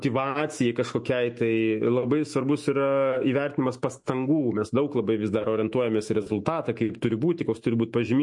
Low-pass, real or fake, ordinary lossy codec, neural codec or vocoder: 10.8 kHz; fake; MP3, 48 kbps; autoencoder, 48 kHz, 128 numbers a frame, DAC-VAE, trained on Japanese speech